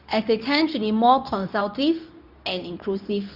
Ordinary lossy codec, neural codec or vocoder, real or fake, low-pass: none; codec, 24 kHz, 0.9 kbps, WavTokenizer, medium speech release version 2; fake; 5.4 kHz